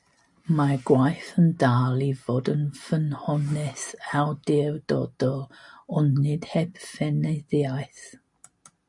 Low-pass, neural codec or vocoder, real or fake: 10.8 kHz; none; real